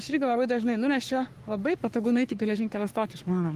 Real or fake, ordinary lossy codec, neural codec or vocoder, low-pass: fake; Opus, 24 kbps; codec, 44.1 kHz, 3.4 kbps, Pupu-Codec; 14.4 kHz